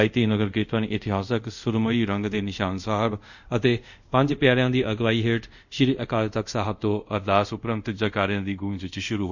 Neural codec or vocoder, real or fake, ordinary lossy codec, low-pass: codec, 24 kHz, 0.5 kbps, DualCodec; fake; none; 7.2 kHz